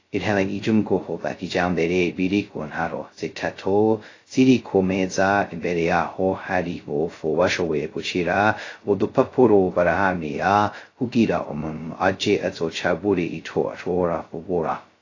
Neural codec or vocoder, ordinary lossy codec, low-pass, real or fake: codec, 16 kHz, 0.2 kbps, FocalCodec; AAC, 32 kbps; 7.2 kHz; fake